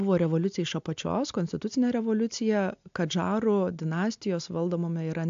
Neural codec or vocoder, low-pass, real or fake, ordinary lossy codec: none; 7.2 kHz; real; AAC, 64 kbps